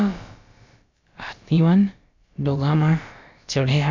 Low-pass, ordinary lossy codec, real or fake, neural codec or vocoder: 7.2 kHz; MP3, 64 kbps; fake; codec, 16 kHz, about 1 kbps, DyCAST, with the encoder's durations